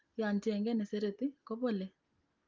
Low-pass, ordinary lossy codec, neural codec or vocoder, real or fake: 7.2 kHz; Opus, 32 kbps; none; real